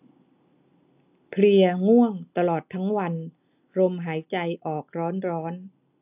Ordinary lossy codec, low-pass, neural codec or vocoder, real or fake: AAC, 32 kbps; 3.6 kHz; none; real